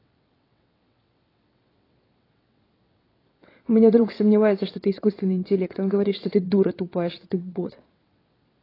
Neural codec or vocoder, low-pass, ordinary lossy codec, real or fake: vocoder, 22.05 kHz, 80 mel bands, WaveNeXt; 5.4 kHz; AAC, 24 kbps; fake